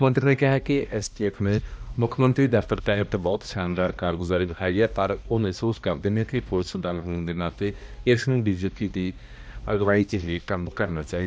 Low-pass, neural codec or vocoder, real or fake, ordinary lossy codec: none; codec, 16 kHz, 1 kbps, X-Codec, HuBERT features, trained on balanced general audio; fake; none